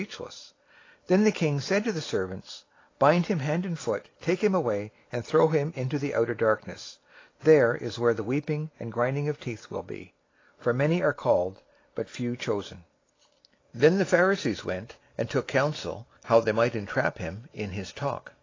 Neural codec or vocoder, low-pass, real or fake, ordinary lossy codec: none; 7.2 kHz; real; AAC, 32 kbps